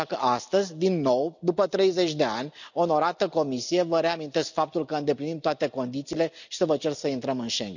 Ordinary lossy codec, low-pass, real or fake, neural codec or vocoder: none; 7.2 kHz; real; none